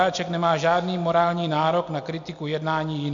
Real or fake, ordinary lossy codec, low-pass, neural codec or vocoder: real; AAC, 64 kbps; 7.2 kHz; none